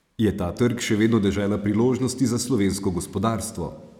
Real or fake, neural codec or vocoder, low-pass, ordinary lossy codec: real; none; 19.8 kHz; none